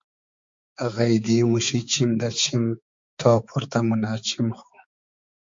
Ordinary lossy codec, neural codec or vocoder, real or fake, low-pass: MP3, 48 kbps; codec, 16 kHz, 4 kbps, X-Codec, HuBERT features, trained on balanced general audio; fake; 7.2 kHz